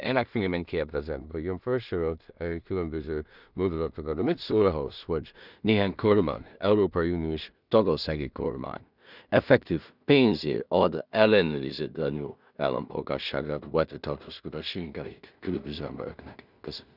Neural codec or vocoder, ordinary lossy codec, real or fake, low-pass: codec, 16 kHz in and 24 kHz out, 0.4 kbps, LongCat-Audio-Codec, two codebook decoder; none; fake; 5.4 kHz